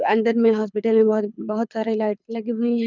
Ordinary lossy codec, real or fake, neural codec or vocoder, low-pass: none; fake; codec, 24 kHz, 6 kbps, HILCodec; 7.2 kHz